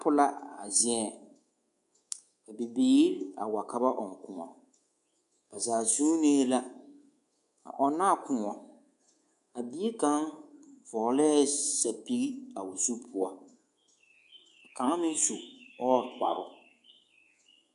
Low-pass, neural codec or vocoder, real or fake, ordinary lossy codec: 10.8 kHz; codec, 24 kHz, 3.1 kbps, DualCodec; fake; AAC, 96 kbps